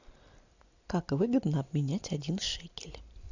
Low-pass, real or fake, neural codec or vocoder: 7.2 kHz; real; none